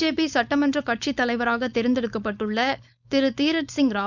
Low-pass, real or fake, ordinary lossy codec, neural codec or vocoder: 7.2 kHz; fake; none; codec, 16 kHz, 4.8 kbps, FACodec